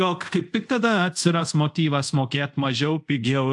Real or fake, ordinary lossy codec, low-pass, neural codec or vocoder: fake; AAC, 64 kbps; 10.8 kHz; codec, 24 kHz, 0.5 kbps, DualCodec